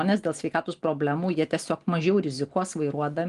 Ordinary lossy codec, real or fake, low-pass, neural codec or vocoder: Opus, 24 kbps; real; 10.8 kHz; none